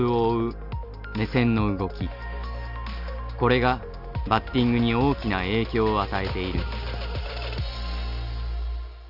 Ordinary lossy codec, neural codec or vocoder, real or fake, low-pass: none; none; real; 5.4 kHz